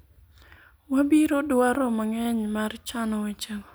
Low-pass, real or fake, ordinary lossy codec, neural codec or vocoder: none; real; none; none